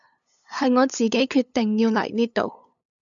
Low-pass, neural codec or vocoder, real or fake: 7.2 kHz; codec, 16 kHz, 8 kbps, FunCodec, trained on LibriTTS, 25 frames a second; fake